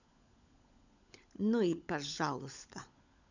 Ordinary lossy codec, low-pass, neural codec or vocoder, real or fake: none; 7.2 kHz; codec, 16 kHz, 16 kbps, FunCodec, trained on LibriTTS, 50 frames a second; fake